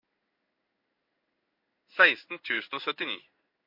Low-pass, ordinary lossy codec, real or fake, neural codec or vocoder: 5.4 kHz; MP3, 32 kbps; fake; codec, 16 kHz in and 24 kHz out, 1 kbps, XY-Tokenizer